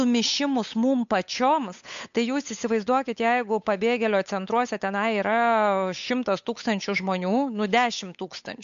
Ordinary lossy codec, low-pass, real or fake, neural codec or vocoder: MP3, 64 kbps; 7.2 kHz; fake; codec, 16 kHz, 8 kbps, FunCodec, trained on Chinese and English, 25 frames a second